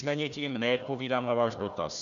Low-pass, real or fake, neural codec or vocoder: 7.2 kHz; fake; codec, 16 kHz, 1 kbps, FunCodec, trained on Chinese and English, 50 frames a second